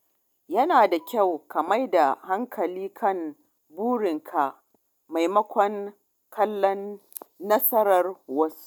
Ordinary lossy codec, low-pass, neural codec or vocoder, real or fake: none; none; none; real